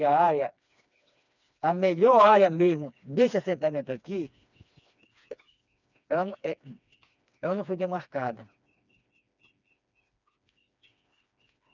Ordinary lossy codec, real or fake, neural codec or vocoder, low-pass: none; fake; codec, 16 kHz, 2 kbps, FreqCodec, smaller model; 7.2 kHz